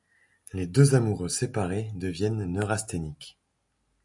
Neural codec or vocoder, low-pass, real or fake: none; 10.8 kHz; real